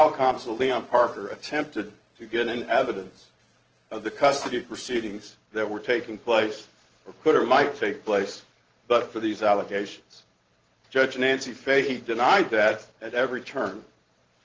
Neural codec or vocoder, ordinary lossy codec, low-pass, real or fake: vocoder, 44.1 kHz, 128 mel bands every 512 samples, BigVGAN v2; Opus, 16 kbps; 7.2 kHz; fake